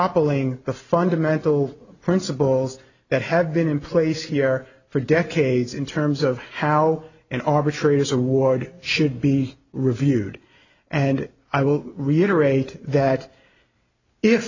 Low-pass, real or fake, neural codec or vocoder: 7.2 kHz; real; none